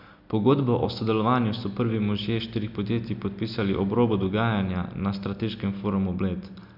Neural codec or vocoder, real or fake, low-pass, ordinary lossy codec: none; real; 5.4 kHz; none